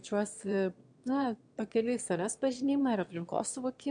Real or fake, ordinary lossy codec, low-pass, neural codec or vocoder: fake; MP3, 64 kbps; 9.9 kHz; autoencoder, 22.05 kHz, a latent of 192 numbers a frame, VITS, trained on one speaker